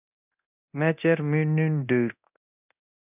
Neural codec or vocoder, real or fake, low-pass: codec, 24 kHz, 0.9 kbps, DualCodec; fake; 3.6 kHz